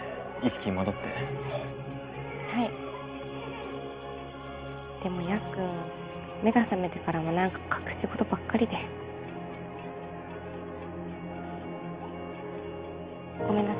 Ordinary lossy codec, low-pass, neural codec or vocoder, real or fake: Opus, 24 kbps; 3.6 kHz; none; real